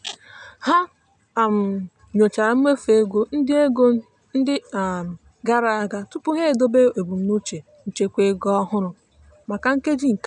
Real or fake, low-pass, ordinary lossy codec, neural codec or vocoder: real; 9.9 kHz; none; none